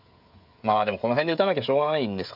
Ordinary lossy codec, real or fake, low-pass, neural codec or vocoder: none; fake; 5.4 kHz; codec, 16 kHz, 16 kbps, FreqCodec, smaller model